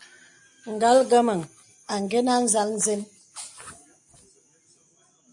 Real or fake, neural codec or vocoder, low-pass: real; none; 10.8 kHz